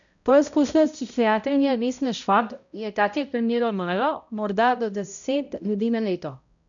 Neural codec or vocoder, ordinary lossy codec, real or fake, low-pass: codec, 16 kHz, 0.5 kbps, X-Codec, HuBERT features, trained on balanced general audio; none; fake; 7.2 kHz